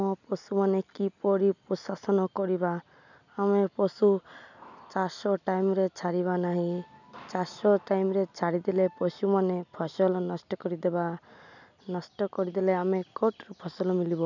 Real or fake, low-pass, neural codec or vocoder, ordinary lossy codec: real; 7.2 kHz; none; none